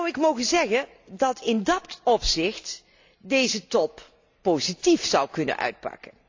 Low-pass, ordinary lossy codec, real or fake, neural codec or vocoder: 7.2 kHz; AAC, 48 kbps; real; none